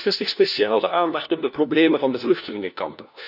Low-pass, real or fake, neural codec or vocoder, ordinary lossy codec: 5.4 kHz; fake; codec, 16 kHz, 1 kbps, FunCodec, trained on LibriTTS, 50 frames a second; none